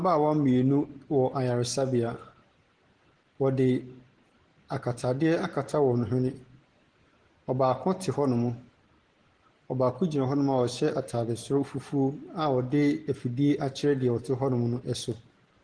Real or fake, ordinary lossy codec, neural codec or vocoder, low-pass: real; Opus, 16 kbps; none; 9.9 kHz